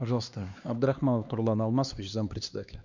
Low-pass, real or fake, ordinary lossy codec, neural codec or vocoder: 7.2 kHz; fake; none; codec, 16 kHz, 2 kbps, X-Codec, HuBERT features, trained on LibriSpeech